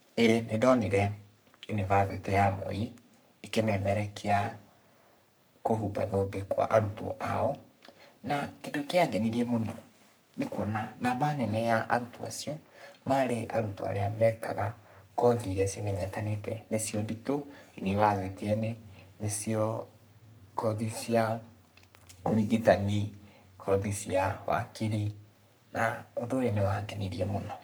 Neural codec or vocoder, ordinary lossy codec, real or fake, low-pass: codec, 44.1 kHz, 3.4 kbps, Pupu-Codec; none; fake; none